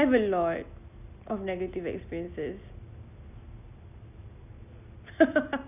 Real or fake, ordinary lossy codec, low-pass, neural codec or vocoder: real; none; 3.6 kHz; none